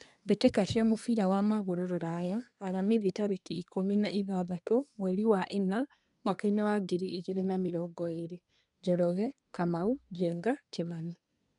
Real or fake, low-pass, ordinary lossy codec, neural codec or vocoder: fake; 10.8 kHz; none; codec, 24 kHz, 1 kbps, SNAC